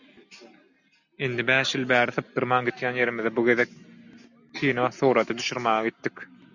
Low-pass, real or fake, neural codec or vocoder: 7.2 kHz; real; none